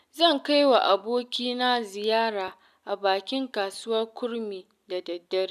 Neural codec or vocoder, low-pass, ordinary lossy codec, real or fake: none; 14.4 kHz; none; real